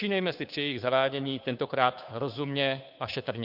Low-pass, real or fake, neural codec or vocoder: 5.4 kHz; fake; codec, 16 kHz, 2 kbps, FunCodec, trained on Chinese and English, 25 frames a second